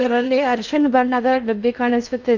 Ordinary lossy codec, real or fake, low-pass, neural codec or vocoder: none; fake; 7.2 kHz; codec, 16 kHz in and 24 kHz out, 0.6 kbps, FocalCodec, streaming, 2048 codes